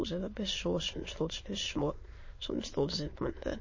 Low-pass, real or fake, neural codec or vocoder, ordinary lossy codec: 7.2 kHz; fake; autoencoder, 22.05 kHz, a latent of 192 numbers a frame, VITS, trained on many speakers; MP3, 32 kbps